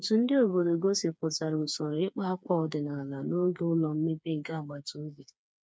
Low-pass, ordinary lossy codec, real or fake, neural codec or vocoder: none; none; fake; codec, 16 kHz, 4 kbps, FreqCodec, smaller model